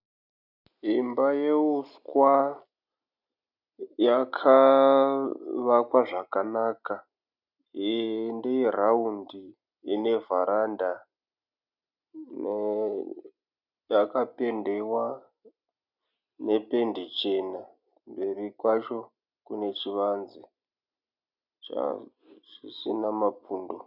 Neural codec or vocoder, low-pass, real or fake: none; 5.4 kHz; real